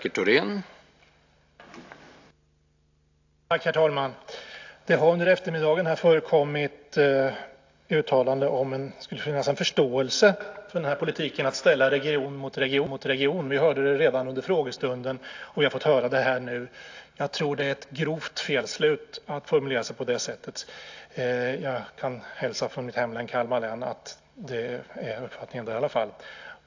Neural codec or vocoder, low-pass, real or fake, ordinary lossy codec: none; 7.2 kHz; real; AAC, 48 kbps